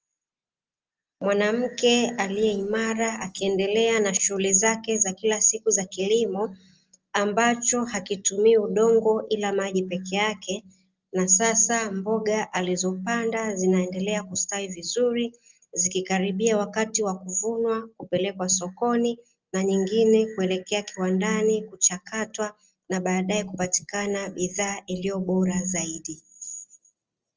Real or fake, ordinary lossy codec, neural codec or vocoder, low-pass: real; Opus, 24 kbps; none; 7.2 kHz